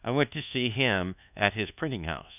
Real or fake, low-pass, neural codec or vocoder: fake; 3.6 kHz; codec, 24 kHz, 1.2 kbps, DualCodec